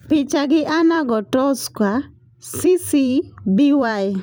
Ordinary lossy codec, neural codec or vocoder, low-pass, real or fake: none; vocoder, 44.1 kHz, 128 mel bands every 256 samples, BigVGAN v2; none; fake